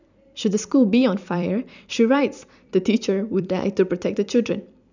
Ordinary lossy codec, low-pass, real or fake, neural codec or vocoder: none; 7.2 kHz; fake; vocoder, 44.1 kHz, 128 mel bands every 256 samples, BigVGAN v2